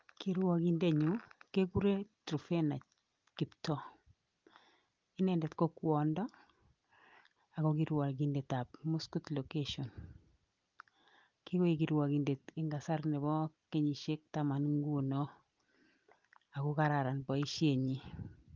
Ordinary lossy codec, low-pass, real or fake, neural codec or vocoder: Opus, 32 kbps; 7.2 kHz; real; none